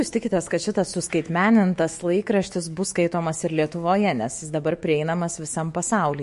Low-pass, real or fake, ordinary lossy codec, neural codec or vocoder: 14.4 kHz; fake; MP3, 48 kbps; autoencoder, 48 kHz, 128 numbers a frame, DAC-VAE, trained on Japanese speech